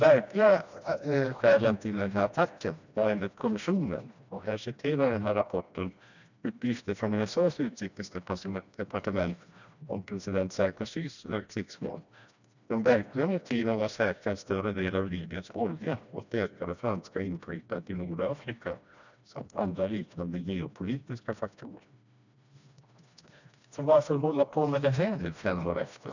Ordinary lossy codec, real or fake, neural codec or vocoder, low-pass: none; fake; codec, 16 kHz, 1 kbps, FreqCodec, smaller model; 7.2 kHz